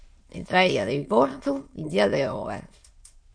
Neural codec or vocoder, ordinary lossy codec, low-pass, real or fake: autoencoder, 22.05 kHz, a latent of 192 numbers a frame, VITS, trained on many speakers; MP3, 48 kbps; 9.9 kHz; fake